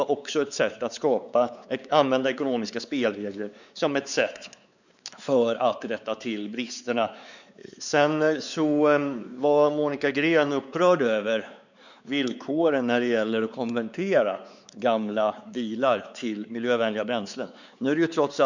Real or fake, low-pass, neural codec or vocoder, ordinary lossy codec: fake; 7.2 kHz; codec, 16 kHz, 4 kbps, X-Codec, WavLM features, trained on Multilingual LibriSpeech; none